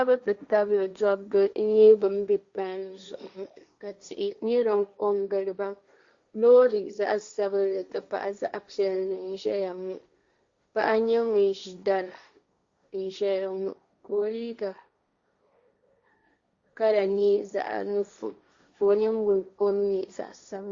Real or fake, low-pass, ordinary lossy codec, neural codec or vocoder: fake; 7.2 kHz; Opus, 64 kbps; codec, 16 kHz, 1.1 kbps, Voila-Tokenizer